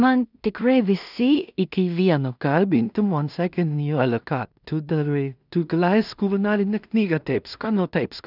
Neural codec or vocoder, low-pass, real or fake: codec, 16 kHz in and 24 kHz out, 0.4 kbps, LongCat-Audio-Codec, two codebook decoder; 5.4 kHz; fake